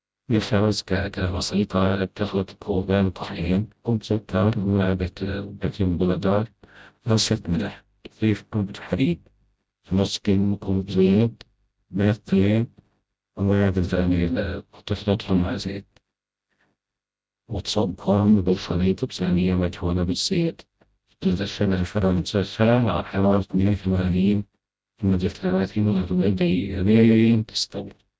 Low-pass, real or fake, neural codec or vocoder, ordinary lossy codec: none; fake; codec, 16 kHz, 0.5 kbps, FreqCodec, smaller model; none